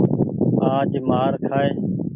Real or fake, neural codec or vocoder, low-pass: real; none; 3.6 kHz